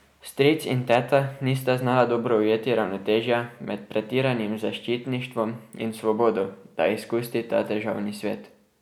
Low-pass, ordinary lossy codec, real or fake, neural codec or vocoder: 19.8 kHz; none; real; none